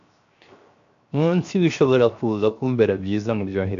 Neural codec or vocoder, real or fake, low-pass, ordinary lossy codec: codec, 16 kHz, 0.7 kbps, FocalCodec; fake; 7.2 kHz; AAC, 64 kbps